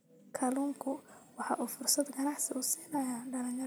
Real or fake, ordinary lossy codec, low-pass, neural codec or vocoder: fake; none; none; vocoder, 44.1 kHz, 128 mel bands every 256 samples, BigVGAN v2